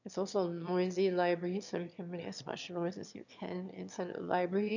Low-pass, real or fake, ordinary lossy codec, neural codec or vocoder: 7.2 kHz; fake; none; autoencoder, 22.05 kHz, a latent of 192 numbers a frame, VITS, trained on one speaker